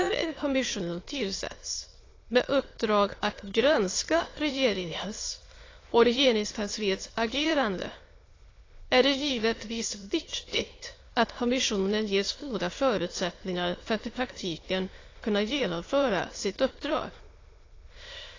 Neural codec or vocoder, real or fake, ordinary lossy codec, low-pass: autoencoder, 22.05 kHz, a latent of 192 numbers a frame, VITS, trained on many speakers; fake; AAC, 32 kbps; 7.2 kHz